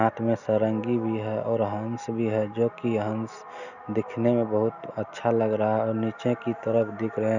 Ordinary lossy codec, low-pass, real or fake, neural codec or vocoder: none; 7.2 kHz; real; none